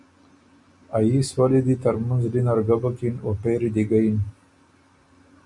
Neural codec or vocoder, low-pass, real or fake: none; 10.8 kHz; real